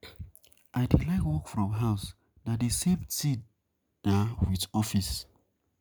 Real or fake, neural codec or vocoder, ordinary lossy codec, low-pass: real; none; none; none